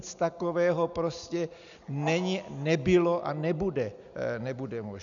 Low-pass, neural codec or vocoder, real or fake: 7.2 kHz; none; real